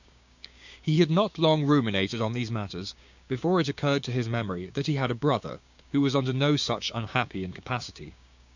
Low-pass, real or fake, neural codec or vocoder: 7.2 kHz; fake; codec, 16 kHz, 6 kbps, DAC